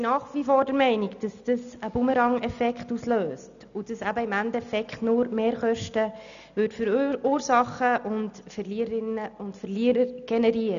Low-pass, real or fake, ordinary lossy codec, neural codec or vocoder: 7.2 kHz; real; none; none